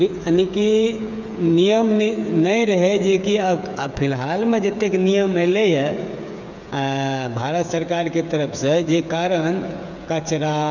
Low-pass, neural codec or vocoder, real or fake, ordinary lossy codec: 7.2 kHz; codec, 16 kHz, 8 kbps, FreqCodec, smaller model; fake; none